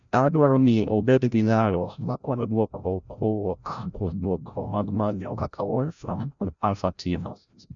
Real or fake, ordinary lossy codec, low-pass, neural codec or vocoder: fake; none; 7.2 kHz; codec, 16 kHz, 0.5 kbps, FreqCodec, larger model